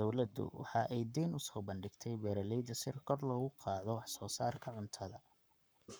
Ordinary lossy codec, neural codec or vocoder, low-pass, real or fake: none; codec, 44.1 kHz, 7.8 kbps, Pupu-Codec; none; fake